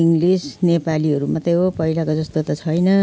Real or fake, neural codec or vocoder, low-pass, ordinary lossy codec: real; none; none; none